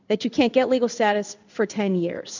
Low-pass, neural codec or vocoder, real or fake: 7.2 kHz; codec, 16 kHz in and 24 kHz out, 1 kbps, XY-Tokenizer; fake